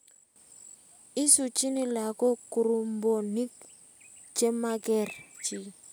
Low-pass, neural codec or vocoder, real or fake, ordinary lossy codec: none; none; real; none